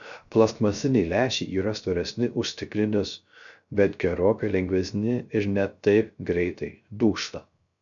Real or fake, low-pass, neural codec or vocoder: fake; 7.2 kHz; codec, 16 kHz, 0.3 kbps, FocalCodec